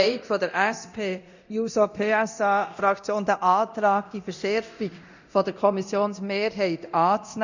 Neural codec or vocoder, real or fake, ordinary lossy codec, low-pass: codec, 24 kHz, 0.9 kbps, DualCodec; fake; none; 7.2 kHz